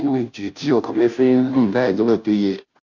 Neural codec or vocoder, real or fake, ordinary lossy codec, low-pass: codec, 16 kHz, 0.5 kbps, FunCodec, trained on Chinese and English, 25 frames a second; fake; none; 7.2 kHz